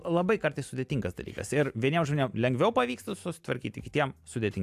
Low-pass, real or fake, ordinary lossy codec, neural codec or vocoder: 14.4 kHz; real; AAC, 96 kbps; none